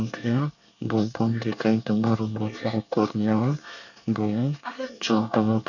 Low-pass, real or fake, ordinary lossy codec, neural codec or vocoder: 7.2 kHz; fake; none; codec, 24 kHz, 1 kbps, SNAC